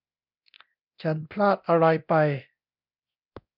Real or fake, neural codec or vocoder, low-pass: fake; codec, 24 kHz, 0.9 kbps, DualCodec; 5.4 kHz